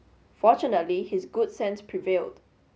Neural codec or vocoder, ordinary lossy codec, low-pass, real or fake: none; none; none; real